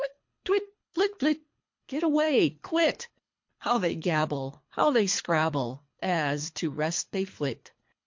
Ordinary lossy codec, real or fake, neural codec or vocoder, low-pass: MP3, 48 kbps; fake; codec, 24 kHz, 3 kbps, HILCodec; 7.2 kHz